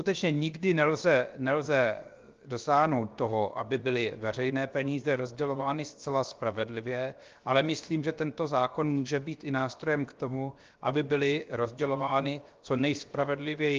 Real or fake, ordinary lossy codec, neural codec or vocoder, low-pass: fake; Opus, 32 kbps; codec, 16 kHz, 0.7 kbps, FocalCodec; 7.2 kHz